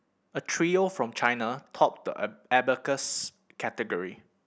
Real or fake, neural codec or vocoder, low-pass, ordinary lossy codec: real; none; none; none